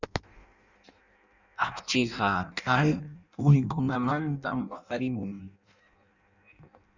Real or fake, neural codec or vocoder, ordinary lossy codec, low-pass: fake; codec, 16 kHz in and 24 kHz out, 0.6 kbps, FireRedTTS-2 codec; Opus, 64 kbps; 7.2 kHz